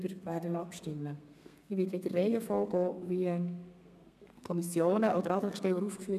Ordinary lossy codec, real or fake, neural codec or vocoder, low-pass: none; fake; codec, 32 kHz, 1.9 kbps, SNAC; 14.4 kHz